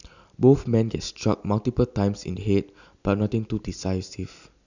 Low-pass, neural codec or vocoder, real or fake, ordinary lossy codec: 7.2 kHz; vocoder, 44.1 kHz, 128 mel bands every 256 samples, BigVGAN v2; fake; none